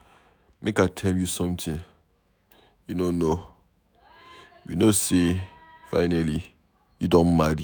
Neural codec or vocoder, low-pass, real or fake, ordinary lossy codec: autoencoder, 48 kHz, 128 numbers a frame, DAC-VAE, trained on Japanese speech; none; fake; none